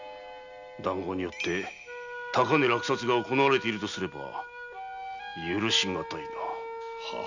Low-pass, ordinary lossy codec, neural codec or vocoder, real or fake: 7.2 kHz; none; none; real